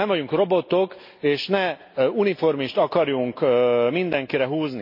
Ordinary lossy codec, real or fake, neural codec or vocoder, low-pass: MP3, 32 kbps; real; none; 5.4 kHz